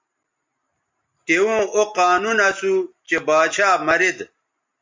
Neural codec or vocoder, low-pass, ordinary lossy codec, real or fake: none; 7.2 kHz; MP3, 64 kbps; real